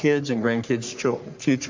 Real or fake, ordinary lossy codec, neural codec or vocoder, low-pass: fake; MP3, 64 kbps; codec, 44.1 kHz, 3.4 kbps, Pupu-Codec; 7.2 kHz